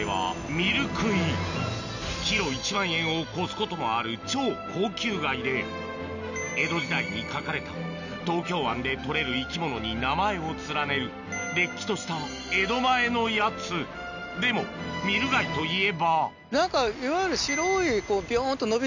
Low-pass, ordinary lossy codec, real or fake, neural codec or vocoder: 7.2 kHz; none; real; none